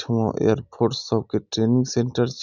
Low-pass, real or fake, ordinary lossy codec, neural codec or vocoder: 7.2 kHz; real; none; none